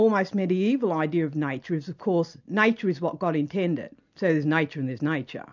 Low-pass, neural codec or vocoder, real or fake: 7.2 kHz; none; real